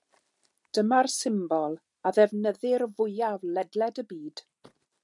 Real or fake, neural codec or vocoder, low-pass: real; none; 10.8 kHz